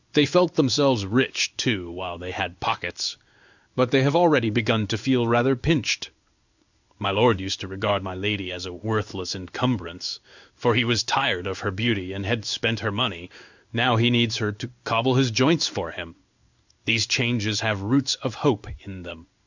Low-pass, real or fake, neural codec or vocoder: 7.2 kHz; fake; codec, 16 kHz in and 24 kHz out, 1 kbps, XY-Tokenizer